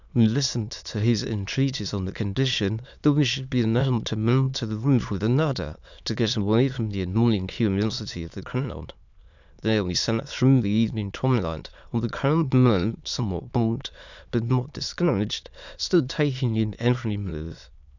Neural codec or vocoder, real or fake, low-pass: autoencoder, 22.05 kHz, a latent of 192 numbers a frame, VITS, trained on many speakers; fake; 7.2 kHz